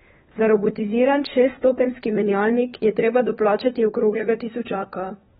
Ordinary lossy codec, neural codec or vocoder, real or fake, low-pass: AAC, 16 kbps; codec, 16 kHz, 4 kbps, FunCodec, trained on LibriTTS, 50 frames a second; fake; 7.2 kHz